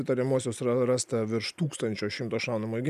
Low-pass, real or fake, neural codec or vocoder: 14.4 kHz; real; none